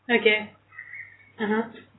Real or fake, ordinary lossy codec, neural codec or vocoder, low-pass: real; AAC, 16 kbps; none; 7.2 kHz